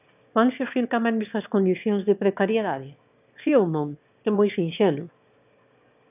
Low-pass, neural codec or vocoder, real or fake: 3.6 kHz; autoencoder, 22.05 kHz, a latent of 192 numbers a frame, VITS, trained on one speaker; fake